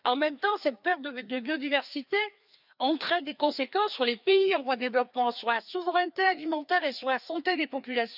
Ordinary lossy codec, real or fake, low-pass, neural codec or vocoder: none; fake; 5.4 kHz; codec, 16 kHz, 2 kbps, FreqCodec, larger model